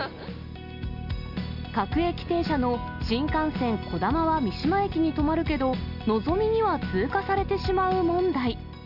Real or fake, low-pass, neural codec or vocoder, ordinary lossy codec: real; 5.4 kHz; none; none